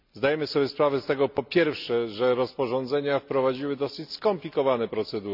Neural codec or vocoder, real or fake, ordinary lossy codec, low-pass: none; real; none; 5.4 kHz